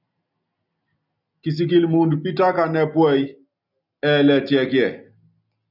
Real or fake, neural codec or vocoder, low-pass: real; none; 5.4 kHz